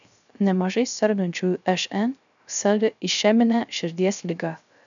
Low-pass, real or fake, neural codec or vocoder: 7.2 kHz; fake; codec, 16 kHz, 0.3 kbps, FocalCodec